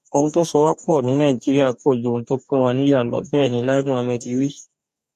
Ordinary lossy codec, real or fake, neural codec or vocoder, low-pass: none; fake; codec, 44.1 kHz, 2.6 kbps, DAC; 14.4 kHz